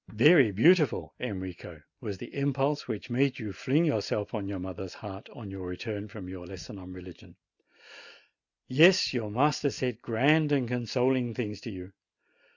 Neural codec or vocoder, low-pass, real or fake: none; 7.2 kHz; real